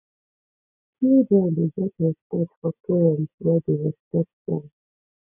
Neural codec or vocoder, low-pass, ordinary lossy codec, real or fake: none; 3.6 kHz; none; real